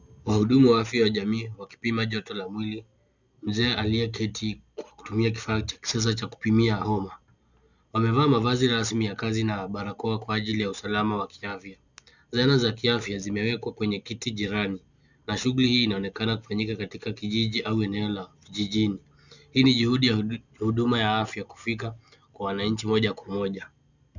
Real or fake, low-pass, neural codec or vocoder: real; 7.2 kHz; none